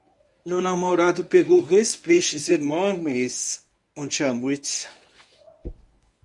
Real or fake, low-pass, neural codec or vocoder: fake; 10.8 kHz; codec, 24 kHz, 0.9 kbps, WavTokenizer, medium speech release version 1